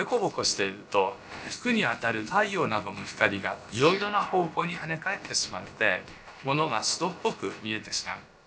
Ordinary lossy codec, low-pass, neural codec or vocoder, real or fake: none; none; codec, 16 kHz, about 1 kbps, DyCAST, with the encoder's durations; fake